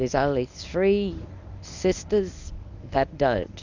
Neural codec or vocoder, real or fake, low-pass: codec, 24 kHz, 0.9 kbps, WavTokenizer, medium speech release version 1; fake; 7.2 kHz